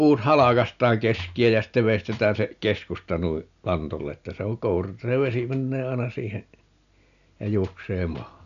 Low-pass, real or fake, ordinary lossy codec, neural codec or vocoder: 7.2 kHz; real; MP3, 96 kbps; none